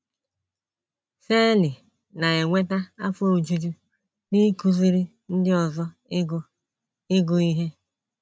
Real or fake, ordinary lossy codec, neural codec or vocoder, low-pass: real; none; none; none